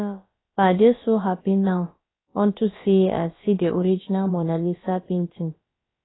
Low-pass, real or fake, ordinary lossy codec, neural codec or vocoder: 7.2 kHz; fake; AAC, 16 kbps; codec, 16 kHz, about 1 kbps, DyCAST, with the encoder's durations